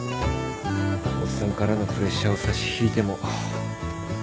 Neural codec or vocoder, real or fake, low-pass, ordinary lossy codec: none; real; none; none